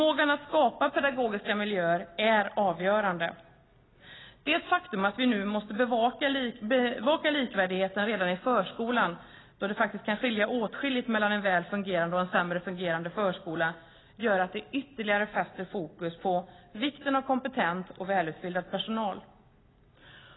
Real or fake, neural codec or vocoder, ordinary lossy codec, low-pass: real; none; AAC, 16 kbps; 7.2 kHz